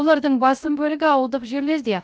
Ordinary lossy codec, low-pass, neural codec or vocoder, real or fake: none; none; codec, 16 kHz, 0.3 kbps, FocalCodec; fake